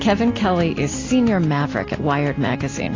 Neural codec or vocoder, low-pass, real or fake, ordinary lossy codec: none; 7.2 kHz; real; AAC, 32 kbps